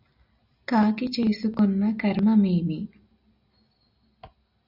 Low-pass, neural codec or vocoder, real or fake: 5.4 kHz; none; real